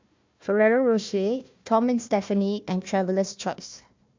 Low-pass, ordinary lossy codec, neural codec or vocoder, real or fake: 7.2 kHz; MP3, 64 kbps; codec, 16 kHz, 1 kbps, FunCodec, trained on Chinese and English, 50 frames a second; fake